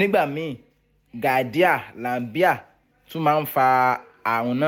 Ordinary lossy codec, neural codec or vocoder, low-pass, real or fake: MP3, 96 kbps; none; 19.8 kHz; real